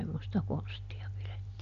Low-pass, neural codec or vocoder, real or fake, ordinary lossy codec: 7.2 kHz; none; real; none